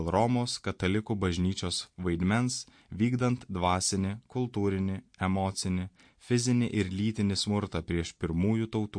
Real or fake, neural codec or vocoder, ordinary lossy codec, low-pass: real; none; MP3, 48 kbps; 9.9 kHz